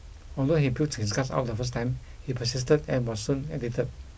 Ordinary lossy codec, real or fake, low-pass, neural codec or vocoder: none; real; none; none